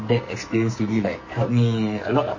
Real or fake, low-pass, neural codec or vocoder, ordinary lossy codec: fake; 7.2 kHz; codec, 44.1 kHz, 2.6 kbps, SNAC; MP3, 32 kbps